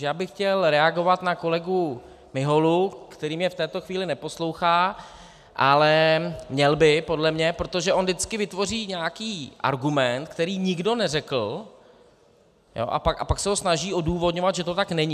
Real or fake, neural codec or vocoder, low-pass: real; none; 14.4 kHz